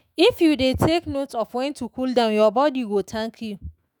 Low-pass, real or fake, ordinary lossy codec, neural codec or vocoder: none; fake; none; autoencoder, 48 kHz, 128 numbers a frame, DAC-VAE, trained on Japanese speech